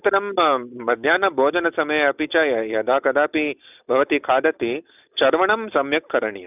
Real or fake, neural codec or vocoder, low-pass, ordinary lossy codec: real; none; 3.6 kHz; none